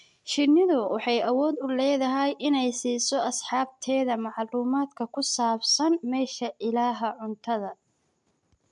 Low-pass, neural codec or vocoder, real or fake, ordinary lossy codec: 10.8 kHz; none; real; MP3, 64 kbps